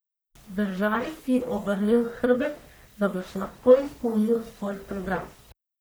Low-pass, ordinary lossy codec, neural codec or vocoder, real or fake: none; none; codec, 44.1 kHz, 1.7 kbps, Pupu-Codec; fake